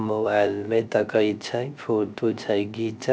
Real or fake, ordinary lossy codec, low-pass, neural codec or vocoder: fake; none; none; codec, 16 kHz, 0.3 kbps, FocalCodec